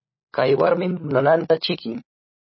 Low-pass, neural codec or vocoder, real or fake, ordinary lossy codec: 7.2 kHz; codec, 16 kHz, 16 kbps, FunCodec, trained on LibriTTS, 50 frames a second; fake; MP3, 24 kbps